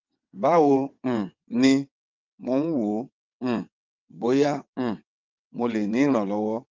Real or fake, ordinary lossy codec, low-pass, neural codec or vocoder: fake; Opus, 24 kbps; 7.2 kHz; vocoder, 22.05 kHz, 80 mel bands, WaveNeXt